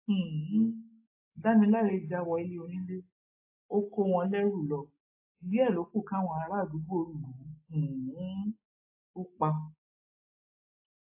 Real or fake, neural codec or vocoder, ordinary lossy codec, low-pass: real; none; AAC, 32 kbps; 3.6 kHz